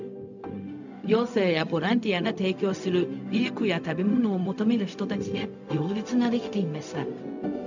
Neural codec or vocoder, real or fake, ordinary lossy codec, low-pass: codec, 16 kHz, 0.4 kbps, LongCat-Audio-Codec; fake; none; 7.2 kHz